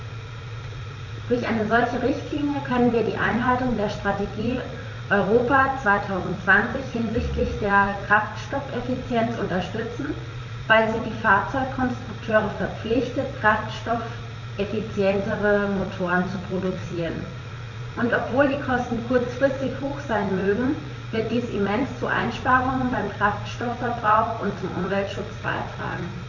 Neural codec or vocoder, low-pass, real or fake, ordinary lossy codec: vocoder, 44.1 kHz, 80 mel bands, Vocos; 7.2 kHz; fake; none